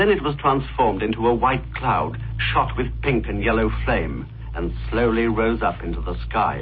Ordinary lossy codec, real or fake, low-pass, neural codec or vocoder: MP3, 24 kbps; real; 7.2 kHz; none